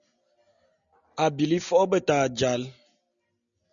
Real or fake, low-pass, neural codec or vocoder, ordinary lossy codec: real; 7.2 kHz; none; MP3, 96 kbps